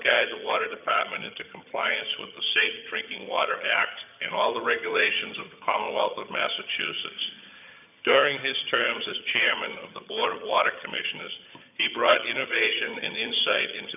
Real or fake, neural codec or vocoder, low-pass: fake; vocoder, 22.05 kHz, 80 mel bands, HiFi-GAN; 3.6 kHz